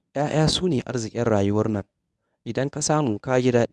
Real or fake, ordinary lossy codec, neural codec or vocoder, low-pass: fake; none; codec, 24 kHz, 0.9 kbps, WavTokenizer, medium speech release version 1; none